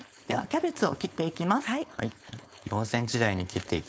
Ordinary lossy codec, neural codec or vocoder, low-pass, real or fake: none; codec, 16 kHz, 4.8 kbps, FACodec; none; fake